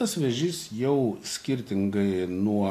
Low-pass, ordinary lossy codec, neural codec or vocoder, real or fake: 14.4 kHz; AAC, 64 kbps; none; real